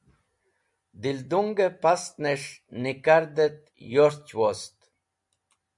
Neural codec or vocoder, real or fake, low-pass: none; real; 10.8 kHz